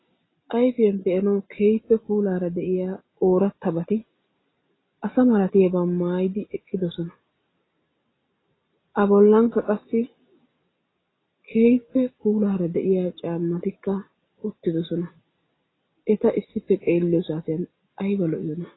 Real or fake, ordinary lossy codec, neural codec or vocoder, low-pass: real; AAC, 16 kbps; none; 7.2 kHz